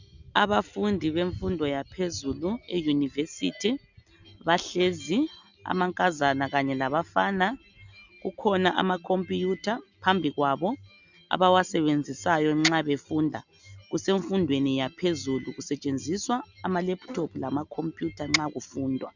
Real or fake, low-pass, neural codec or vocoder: real; 7.2 kHz; none